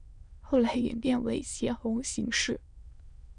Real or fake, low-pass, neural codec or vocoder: fake; 9.9 kHz; autoencoder, 22.05 kHz, a latent of 192 numbers a frame, VITS, trained on many speakers